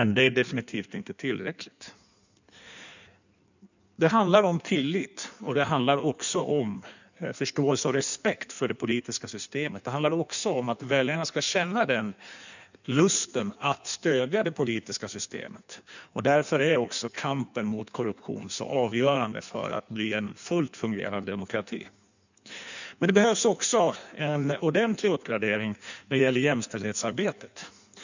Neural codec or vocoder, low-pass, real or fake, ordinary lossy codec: codec, 16 kHz in and 24 kHz out, 1.1 kbps, FireRedTTS-2 codec; 7.2 kHz; fake; none